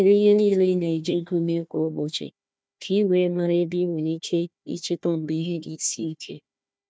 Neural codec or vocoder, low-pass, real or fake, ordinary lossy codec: codec, 16 kHz, 1 kbps, FunCodec, trained on Chinese and English, 50 frames a second; none; fake; none